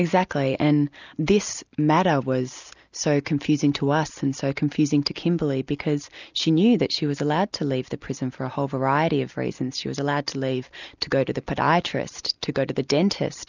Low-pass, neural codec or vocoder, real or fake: 7.2 kHz; none; real